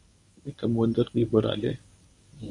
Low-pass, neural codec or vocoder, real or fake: 10.8 kHz; codec, 24 kHz, 0.9 kbps, WavTokenizer, medium speech release version 1; fake